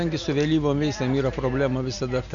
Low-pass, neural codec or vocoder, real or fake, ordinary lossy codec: 7.2 kHz; none; real; MP3, 48 kbps